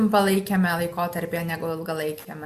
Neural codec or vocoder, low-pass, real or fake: none; 14.4 kHz; real